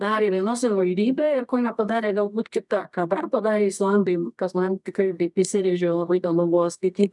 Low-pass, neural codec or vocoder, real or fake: 10.8 kHz; codec, 24 kHz, 0.9 kbps, WavTokenizer, medium music audio release; fake